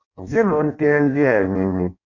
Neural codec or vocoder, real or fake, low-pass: codec, 16 kHz in and 24 kHz out, 0.6 kbps, FireRedTTS-2 codec; fake; 7.2 kHz